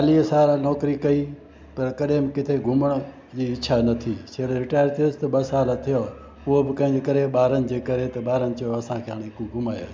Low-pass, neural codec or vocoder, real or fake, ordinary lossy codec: none; none; real; none